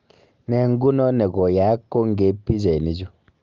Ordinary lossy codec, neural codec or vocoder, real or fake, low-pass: Opus, 32 kbps; none; real; 7.2 kHz